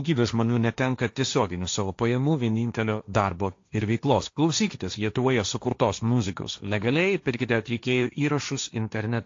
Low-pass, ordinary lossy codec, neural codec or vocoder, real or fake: 7.2 kHz; AAC, 48 kbps; codec, 16 kHz, 1.1 kbps, Voila-Tokenizer; fake